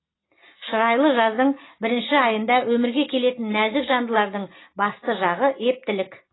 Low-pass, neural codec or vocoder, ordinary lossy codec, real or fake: 7.2 kHz; none; AAC, 16 kbps; real